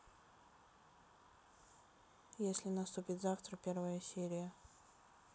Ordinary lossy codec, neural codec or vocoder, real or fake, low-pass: none; none; real; none